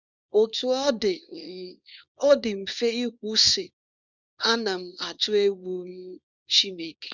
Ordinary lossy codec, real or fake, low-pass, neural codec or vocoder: none; fake; 7.2 kHz; codec, 24 kHz, 0.9 kbps, WavTokenizer, small release